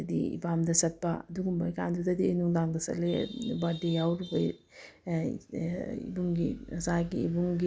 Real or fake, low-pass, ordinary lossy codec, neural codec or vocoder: real; none; none; none